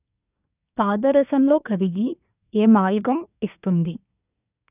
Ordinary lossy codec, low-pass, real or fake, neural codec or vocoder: none; 3.6 kHz; fake; codec, 24 kHz, 1 kbps, SNAC